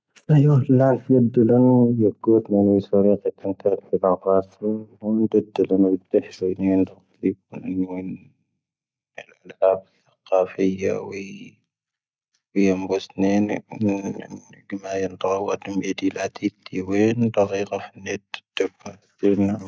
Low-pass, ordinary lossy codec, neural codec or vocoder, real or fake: none; none; none; real